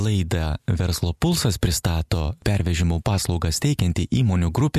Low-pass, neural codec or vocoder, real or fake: 14.4 kHz; none; real